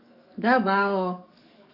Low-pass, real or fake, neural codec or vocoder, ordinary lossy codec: 5.4 kHz; fake; codec, 44.1 kHz, 7.8 kbps, DAC; AAC, 48 kbps